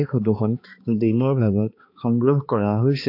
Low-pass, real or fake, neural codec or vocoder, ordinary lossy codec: 5.4 kHz; fake; codec, 16 kHz, 2 kbps, X-Codec, HuBERT features, trained on balanced general audio; MP3, 32 kbps